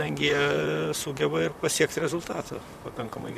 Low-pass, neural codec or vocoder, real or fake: 14.4 kHz; vocoder, 44.1 kHz, 128 mel bands, Pupu-Vocoder; fake